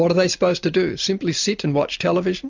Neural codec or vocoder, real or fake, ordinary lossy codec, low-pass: none; real; MP3, 64 kbps; 7.2 kHz